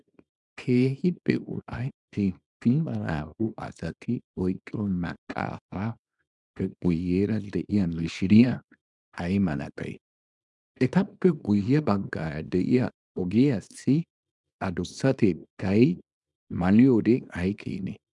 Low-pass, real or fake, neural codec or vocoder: 10.8 kHz; fake; codec, 24 kHz, 0.9 kbps, WavTokenizer, small release